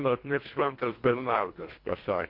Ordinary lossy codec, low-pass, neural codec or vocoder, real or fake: MP3, 32 kbps; 5.4 kHz; codec, 24 kHz, 1.5 kbps, HILCodec; fake